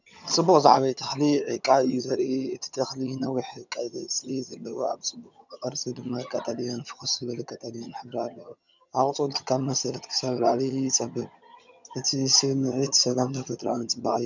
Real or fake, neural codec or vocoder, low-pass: fake; vocoder, 22.05 kHz, 80 mel bands, HiFi-GAN; 7.2 kHz